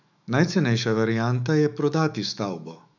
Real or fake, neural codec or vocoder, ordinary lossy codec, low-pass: fake; autoencoder, 48 kHz, 128 numbers a frame, DAC-VAE, trained on Japanese speech; none; 7.2 kHz